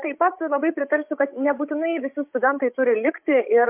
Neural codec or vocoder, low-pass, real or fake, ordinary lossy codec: codec, 16 kHz, 16 kbps, FreqCodec, larger model; 3.6 kHz; fake; MP3, 32 kbps